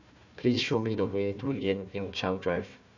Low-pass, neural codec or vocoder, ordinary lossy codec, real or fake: 7.2 kHz; codec, 16 kHz, 1 kbps, FunCodec, trained on Chinese and English, 50 frames a second; none; fake